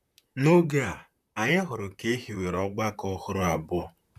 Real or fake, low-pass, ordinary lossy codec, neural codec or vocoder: fake; 14.4 kHz; none; vocoder, 44.1 kHz, 128 mel bands, Pupu-Vocoder